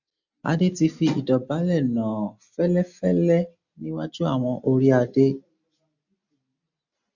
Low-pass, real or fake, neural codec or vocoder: 7.2 kHz; real; none